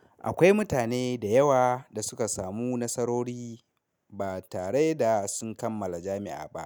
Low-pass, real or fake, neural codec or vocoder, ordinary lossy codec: none; real; none; none